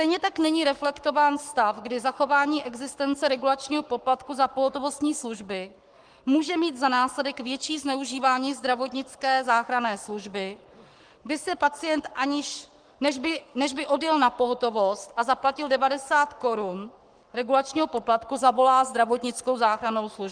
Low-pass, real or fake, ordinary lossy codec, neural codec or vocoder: 9.9 kHz; fake; Opus, 24 kbps; codec, 44.1 kHz, 7.8 kbps, Pupu-Codec